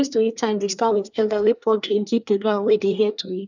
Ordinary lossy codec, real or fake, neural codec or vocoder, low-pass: none; fake; codec, 24 kHz, 1 kbps, SNAC; 7.2 kHz